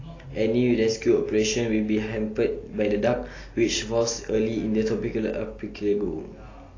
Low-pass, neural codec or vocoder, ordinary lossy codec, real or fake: 7.2 kHz; none; AAC, 32 kbps; real